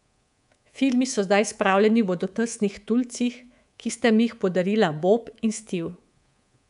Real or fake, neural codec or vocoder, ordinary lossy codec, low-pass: fake; codec, 24 kHz, 3.1 kbps, DualCodec; none; 10.8 kHz